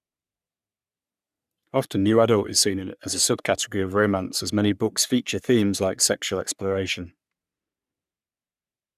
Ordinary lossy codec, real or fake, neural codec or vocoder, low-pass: none; fake; codec, 44.1 kHz, 3.4 kbps, Pupu-Codec; 14.4 kHz